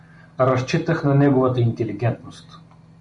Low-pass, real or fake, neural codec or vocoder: 10.8 kHz; real; none